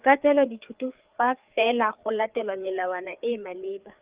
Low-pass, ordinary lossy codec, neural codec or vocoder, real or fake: 3.6 kHz; Opus, 32 kbps; codec, 16 kHz, 4 kbps, FreqCodec, larger model; fake